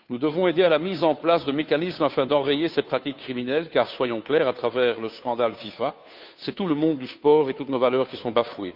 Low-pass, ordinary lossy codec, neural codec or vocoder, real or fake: 5.4 kHz; none; codec, 16 kHz, 2 kbps, FunCodec, trained on Chinese and English, 25 frames a second; fake